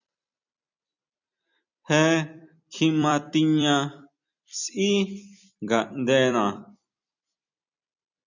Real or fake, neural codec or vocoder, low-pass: fake; vocoder, 44.1 kHz, 128 mel bands every 256 samples, BigVGAN v2; 7.2 kHz